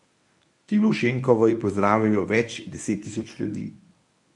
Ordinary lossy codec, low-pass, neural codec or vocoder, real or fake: none; 10.8 kHz; codec, 24 kHz, 0.9 kbps, WavTokenizer, medium speech release version 1; fake